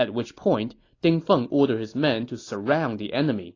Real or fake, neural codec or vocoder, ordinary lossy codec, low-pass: real; none; AAC, 32 kbps; 7.2 kHz